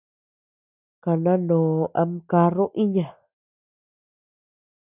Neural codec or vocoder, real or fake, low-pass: none; real; 3.6 kHz